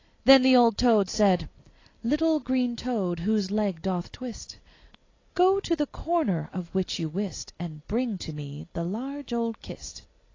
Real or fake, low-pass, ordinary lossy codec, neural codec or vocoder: real; 7.2 kHz; AAC, 32 kbps; none